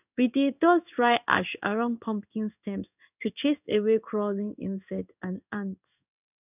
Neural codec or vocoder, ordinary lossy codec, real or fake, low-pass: codec, 16 kHz in and 24 kHz out, 1 kbps, XY-Tokenizer; none; fake; 3.6 kHz